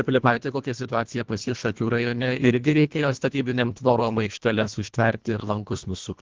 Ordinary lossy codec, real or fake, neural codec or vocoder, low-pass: Opus, 32 kbps; fake; codec, 24 kHz, 1.5 kbps, HILCodec; 7.2 kHz